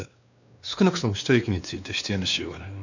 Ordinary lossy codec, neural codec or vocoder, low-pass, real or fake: none; codec, 16 kHz, 2 kbps, X-Codec, WavLM features, trained on Multilingual LibriSpeech; 7.2 kHz; fake